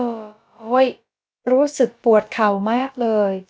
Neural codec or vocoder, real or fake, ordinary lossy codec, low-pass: codec, 16 kHz, about 1 kbps, DyCAST, with the encoder's durations; fake; none; none